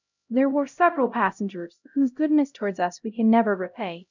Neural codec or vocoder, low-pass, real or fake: codec, 16 kHz, 0.5 kbps, X-Codec, HuBERT features, trained on LibriSpeech; 7.2 kHz; fake